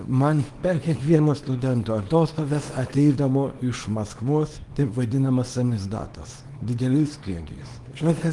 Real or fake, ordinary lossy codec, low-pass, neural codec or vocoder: fake; Opus, 24 kbps; 10.8 kHz; codec, 24 kHz, 0.9 kbps, WavTokenizer, small release